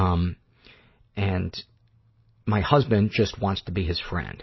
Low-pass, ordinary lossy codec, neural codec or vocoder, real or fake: 7.2 kHz; MP3, 24 kbps; none; real